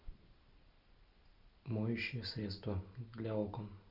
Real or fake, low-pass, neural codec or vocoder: real; 5.4 kHz; none